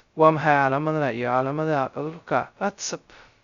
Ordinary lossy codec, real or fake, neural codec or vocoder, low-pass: Opus, 64 kbps; fake; codec, 16 kHz, 0.2 kbps, FocalCodec; 7.2 kHz